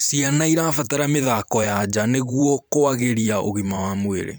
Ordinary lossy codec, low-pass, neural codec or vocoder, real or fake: none; none; vocoder, 44.1 kHz, 128 mel bands every 512 samples, BigVGAN v2; fake